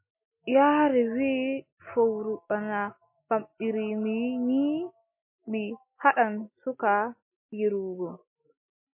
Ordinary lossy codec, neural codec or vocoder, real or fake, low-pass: MP3, 24 kbps; none; real; 3.6 kHz